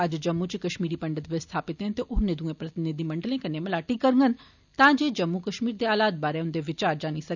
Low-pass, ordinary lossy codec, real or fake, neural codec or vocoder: 7.2 kHz; none; real; none